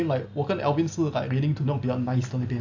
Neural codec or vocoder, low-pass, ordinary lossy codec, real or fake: none; 7.2 kHz; AAC, 48 kbps; real